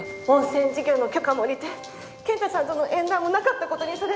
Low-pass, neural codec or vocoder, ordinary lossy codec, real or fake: none; none; none; real